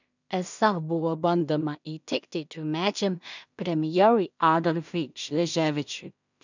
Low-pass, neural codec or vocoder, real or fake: 7.2 kHz; codec, 16 kHz in and 24 kHz out, 0.4 kbps, LongCat-Audio-Codec, two codebook decoder; fake